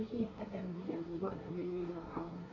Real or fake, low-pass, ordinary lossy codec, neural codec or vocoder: fake; 7.2 kHz; none; codec, 24 kHz, 1 kbps, SNAC